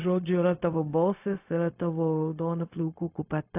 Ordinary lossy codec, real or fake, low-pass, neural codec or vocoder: AAC, 32 kbps; fake; 3.6 kHz; codec, 16 kHz, 0.4 kbps, LongCat-Audio-Codec